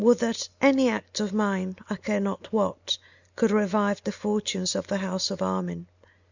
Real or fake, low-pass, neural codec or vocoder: real; 7.2 kHz; none